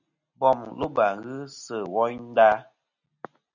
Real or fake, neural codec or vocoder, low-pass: real; none; 7.2 kHz